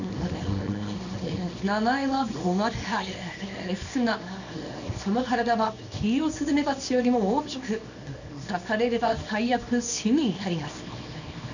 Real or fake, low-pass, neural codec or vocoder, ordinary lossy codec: fake; 7.2 kHz; codec, 24 kHz, 0.9 kbps, WavTokenizer, small release; none